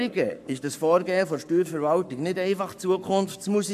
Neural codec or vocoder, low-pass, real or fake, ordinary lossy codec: codec, 44.1 kHz, 7.8 kbps, Pupu-Codec; 14.4 kHz; fake; none